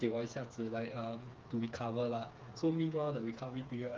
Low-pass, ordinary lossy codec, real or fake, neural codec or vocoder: 7.2 kHz; Opus, 24 kbps; fake; codec, 16 kHz, 4 kbps, FreqCodec, smaller model